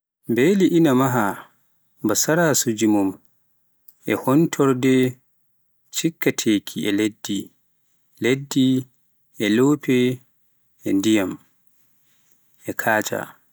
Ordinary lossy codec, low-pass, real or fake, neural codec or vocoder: none; none; real; none